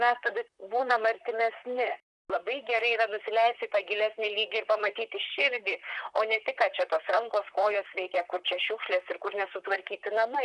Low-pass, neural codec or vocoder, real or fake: 10.8 kHz; vocoder, 44.1 kHz, 128 mel bands, Pupu-Vocoder; fake